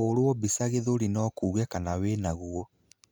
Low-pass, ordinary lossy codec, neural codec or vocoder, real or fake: none; none; none; real